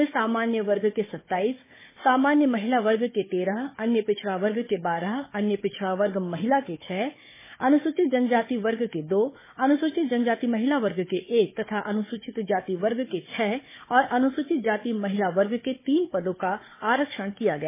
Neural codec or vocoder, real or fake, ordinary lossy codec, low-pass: codec, 16 kHz, 4 kbps, FunCodec, trained on Chinese and English, 50 frames a second; fake; MP3, 16 kbps; 3.6 kHz